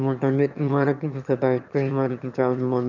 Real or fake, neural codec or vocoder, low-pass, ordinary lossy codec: fake; autoencoder, 22.05 kHz, a latent of 192 numbers a frame, VITS, trained on one speaker; 7.2 kHz; none